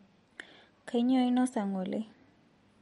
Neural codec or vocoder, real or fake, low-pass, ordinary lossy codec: none; real; 19.8 kHz; MP3, 48 kbps